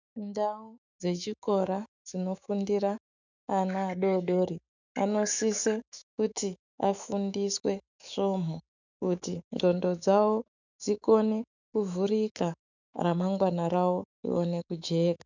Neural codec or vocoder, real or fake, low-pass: autoencoder, 48 kHz, 128 numbers a frame, DAC-VAE, trained on Japanese speech; fake; 7.2 kHz